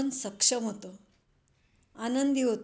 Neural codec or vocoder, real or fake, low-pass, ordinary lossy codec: none; real; none; none